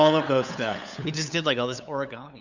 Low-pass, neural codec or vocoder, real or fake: 7.2 kHz; codec, 16 kHz, 8 kbps, FunCodec, trained on LibriTTS, 25 frames a second; fake